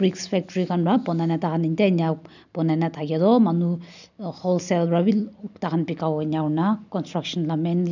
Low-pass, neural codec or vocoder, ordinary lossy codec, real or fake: 7.2 kHz; none; none; real